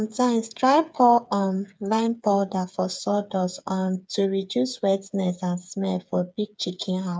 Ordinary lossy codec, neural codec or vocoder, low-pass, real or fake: none; codec, 16 kHz, 8 kbps, FreqCodec, smaller model; none; fake